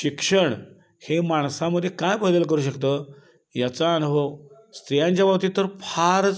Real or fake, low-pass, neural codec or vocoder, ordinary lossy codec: real; none; none; none